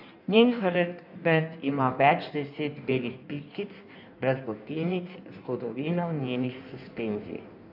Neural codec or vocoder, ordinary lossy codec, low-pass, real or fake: codec, 16 kHz in and 24 kHz out, 1.1 kbps, FireRedTTS-2 codec; none; 5.4 kHz; fake